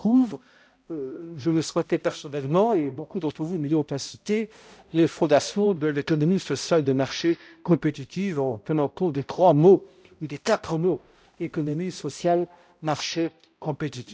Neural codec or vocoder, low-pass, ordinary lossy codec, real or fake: codec, 16 kHz, 0.5 kbps, X-Codec, HuBERT features, trained on balanced general audio; none; none; fake